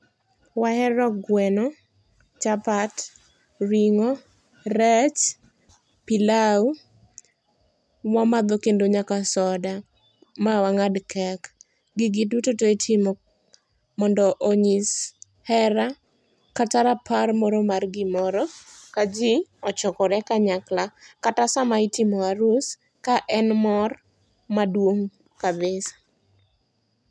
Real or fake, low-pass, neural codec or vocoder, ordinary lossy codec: real; none; none; none